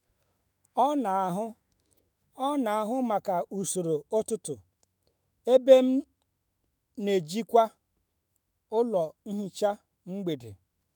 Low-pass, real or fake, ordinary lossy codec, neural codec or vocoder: none; fake; none; autoencoder, 48 kHz, 128 numbers a frame, DAC-VAE, trained on Japanese speech